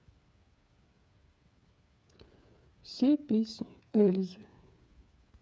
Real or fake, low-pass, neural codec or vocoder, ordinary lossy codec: fake; none; codec, 16 kHz, 8 kbps, FreqCodec, smaller model; none